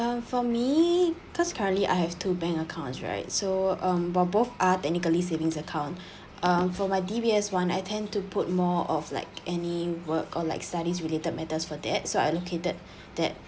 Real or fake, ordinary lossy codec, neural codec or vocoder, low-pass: real; none; none; none